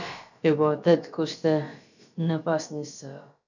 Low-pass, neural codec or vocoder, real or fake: 7.2 kHz; codec, 16 kHz, about 1 kbps, DyCAST, with the encoder's durations; fake